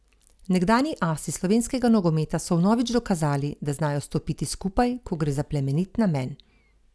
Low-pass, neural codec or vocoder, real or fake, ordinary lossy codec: none; none; real; none